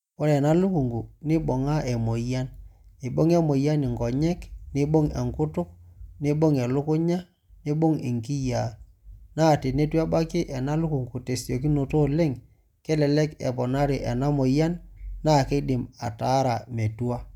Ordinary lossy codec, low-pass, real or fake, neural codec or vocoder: none; 19.8 kHz; real; none